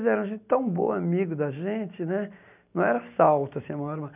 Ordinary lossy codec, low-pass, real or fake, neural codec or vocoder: none; 3.6 kHz; real; none